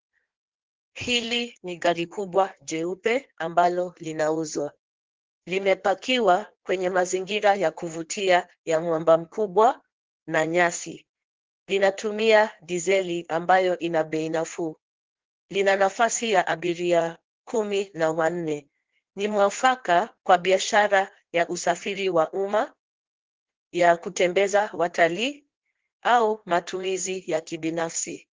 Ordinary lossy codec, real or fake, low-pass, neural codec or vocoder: Opus, 16 kbps; fake; 7.2 kHz; codec, 16 kHz in and 24 kHz out, 1.1 kbps, FireRedTTS-2 codec